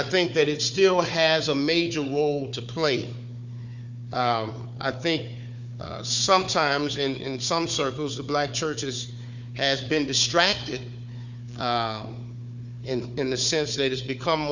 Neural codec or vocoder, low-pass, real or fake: codec, 16 kHz, 4 kbps, FunCodec, trained on Chinese and English, 50 frames a second; 7.2 kHz; fake